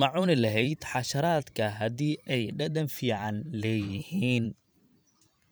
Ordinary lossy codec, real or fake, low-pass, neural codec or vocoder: none; fake; none; vocoder, 44.1 kHz, 128 mel bands every 512 samples, BigVGAN v2